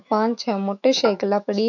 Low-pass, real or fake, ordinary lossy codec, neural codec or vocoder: 7.2 kHz; fake; none; autoencoder, 48 kHz, 128 numbers a frame, DAC-VAE, trained on Japanese speech